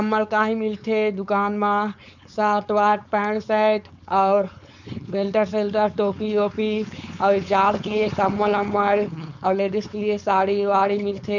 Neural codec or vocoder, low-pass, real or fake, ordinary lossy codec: codec, 16 kHz, 4.8 kbps, FACodec; 7.2 kHz; fake; none